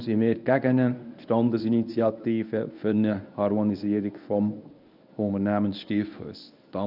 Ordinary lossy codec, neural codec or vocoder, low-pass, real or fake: none; codec, 24 kHz, 0.9 kbps, WavTokenizer, medium speech release version 1; 5.4 kHz; fake